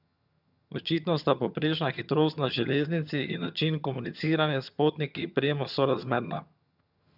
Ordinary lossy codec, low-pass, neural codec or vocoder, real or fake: none; 5.4 kHz; vocoder, 22.05 kHz, 80 mel bands, HiFi-GAN; fake